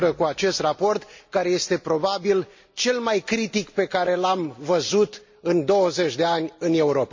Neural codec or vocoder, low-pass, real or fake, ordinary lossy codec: none; 7.2 kHz; real; MP3, 48 kbps